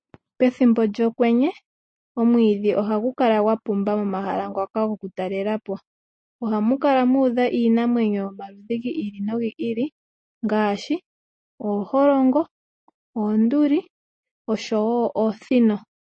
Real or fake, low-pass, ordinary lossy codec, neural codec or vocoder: real; 9.9 kHz; MP3, 32 kbps; none